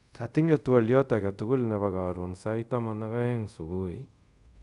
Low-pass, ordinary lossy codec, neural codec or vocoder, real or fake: 10.8 kHz; none; codec, 24 kHz, 0.5 kbps, DualCodec; fake